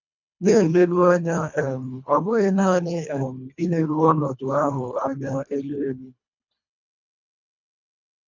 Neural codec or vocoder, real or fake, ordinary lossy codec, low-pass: codec, 24 kHz, 1.5 kbps, HILCodec; fake; none; 7.2 kHz